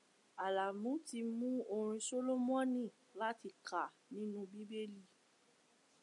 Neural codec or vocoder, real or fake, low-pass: none; real; 10.8 kHz